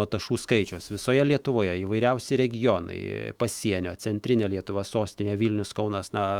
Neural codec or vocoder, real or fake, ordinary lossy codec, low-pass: autoencoder, 48 kHz, 128 numbers a frame, DAC-VAE, trained on Japanese speech; fake; Opus, 64 kbps; 19.8 kHz